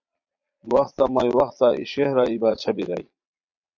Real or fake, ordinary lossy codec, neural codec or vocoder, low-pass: fake; MP3, 64 kbps; vocoder, 22.05 kHz, 80 mel bands, Vocos; 7.2 kHz